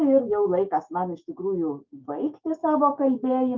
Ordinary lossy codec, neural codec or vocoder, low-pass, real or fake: Opus, 24 kbps; autoencoder, 48 kHz, 128 numbers a frame, DAC-VAE, trained on Japanese speech; 7.2 kHz; fake